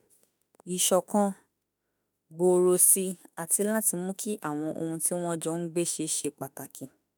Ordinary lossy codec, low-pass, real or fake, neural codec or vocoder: none; none; fake; autoencoder, 48 kHz, 32 numbers a frame, DAC-VAE, trained on Japanese speech